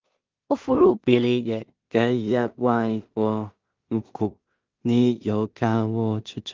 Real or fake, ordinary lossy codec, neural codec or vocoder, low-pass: fake; Opus, 32 kbps; codec, 16 kHz in and 24 kHz out, 0.4 kbps, LongCat-Audio-Codec, two codebook decoder; 7.2 kHz